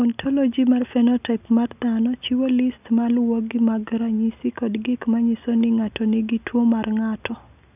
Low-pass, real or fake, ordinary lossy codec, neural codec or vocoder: 3.6 kHz; real; none; none